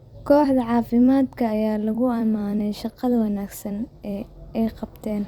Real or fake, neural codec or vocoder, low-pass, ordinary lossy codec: fake; vocoder, 44.1 kHz, 128 mel bands every 256 samples, BigVGAN v2; 19.8 kHz; Opus, 64 kbps